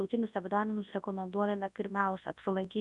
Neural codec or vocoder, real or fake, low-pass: codec, 24 kHz, 0.9 kbps, WavTokenizer, large speech release; fake; 10.8 kHz